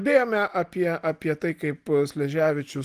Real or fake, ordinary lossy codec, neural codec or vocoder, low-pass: real; Opus, 24 kbps; none; 14.4 kHz